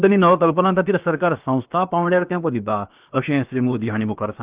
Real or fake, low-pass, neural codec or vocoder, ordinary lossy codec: fake; 3.6 kHz; codec, 16 kHz, 0.7 kbps, FocalCodec; Opus, 24 kbps